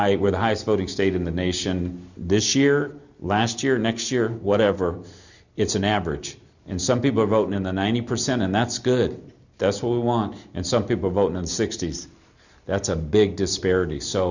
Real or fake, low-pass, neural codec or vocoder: real; 7.2 kHz; none